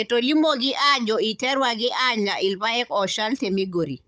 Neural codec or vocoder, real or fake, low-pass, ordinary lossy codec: codec, 16 kHz, 16 kbps, FunCodec, trained on Chinese and English, 50 frames a second; fake; none; none